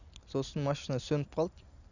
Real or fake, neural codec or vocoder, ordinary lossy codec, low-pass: real; none; none; 7.2 kHz